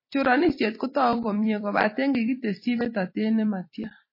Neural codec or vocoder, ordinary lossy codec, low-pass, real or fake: vocoder, 44.1 kHz, 128 mel bands every 256 samples, BigVGAN v2; MP3, 24 kbps; 5.4 kHz; fake